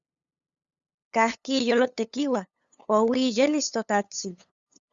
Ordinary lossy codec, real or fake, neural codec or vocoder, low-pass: Opus, 64 kbps; fake; codec, 16 kHz, 8 kbps, FunCodec, trained on LibriTTS, 25 frames a second; 7.2 kHz